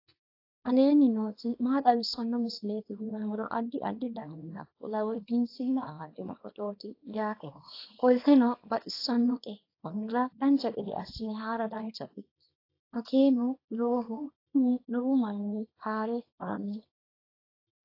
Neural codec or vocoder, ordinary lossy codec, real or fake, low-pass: codec, 24 kHz, 0.9 kbps, WavTokenizer, small release; AAC, 32 kbps; fake; 5.4 kHz